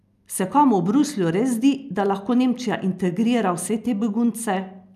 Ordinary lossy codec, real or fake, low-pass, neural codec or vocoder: none; real; 14.4 kHz; none